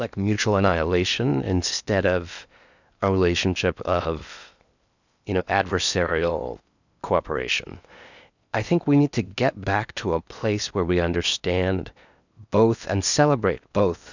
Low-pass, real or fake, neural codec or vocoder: 7.2 kHz; fake; codec, 16 kHz in and 24 kHz out, 0.8 kbps, FocalCodec, streaming, 65536 codes